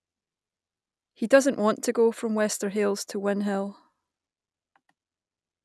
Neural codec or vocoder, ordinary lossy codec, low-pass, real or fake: none; none; none; real